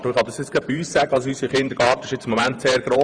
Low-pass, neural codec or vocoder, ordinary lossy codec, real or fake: 9.9 kHz; vocoder, 48 kHz, 128 mel bands, Vocos; Opus, 64 kbps; fake